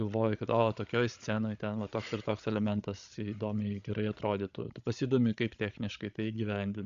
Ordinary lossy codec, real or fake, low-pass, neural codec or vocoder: Opus, 64 kbps; fake; 7.2 kHz; codec, 16 kHz, 16 kbps, FreqCodec, larger model